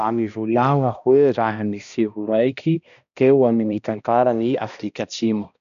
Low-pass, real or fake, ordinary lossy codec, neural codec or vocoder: 7.2 kHz; fake; none; codec, 16 kHz, 1 kbps, X-Codec, HuBERT features, trained on balanced general audio